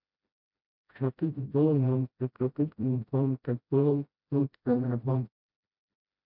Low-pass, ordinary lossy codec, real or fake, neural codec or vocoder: 5.4 kHz; MP3, 48 kbps; fake; codec, 16 kHz, 0.5 kbps, FreqCodec, smaller model